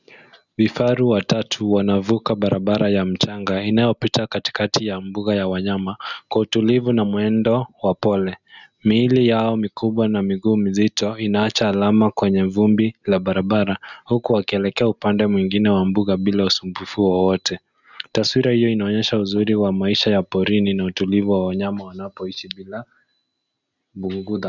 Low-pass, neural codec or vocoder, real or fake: 7.2 kHz; none; real